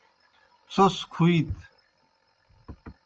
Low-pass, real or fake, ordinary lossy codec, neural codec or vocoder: 7.2 kHz; real; Opus, 24 kbps; none